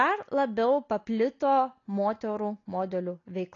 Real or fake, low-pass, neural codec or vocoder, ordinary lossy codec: real; 7.2 kHz; none; AAC, 32 kbps